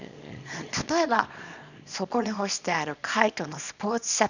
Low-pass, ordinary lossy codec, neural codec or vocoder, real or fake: 7.2 kHz; none; codec, 24 kHz, 0.9 kbps, WavTokenizer, small release; fake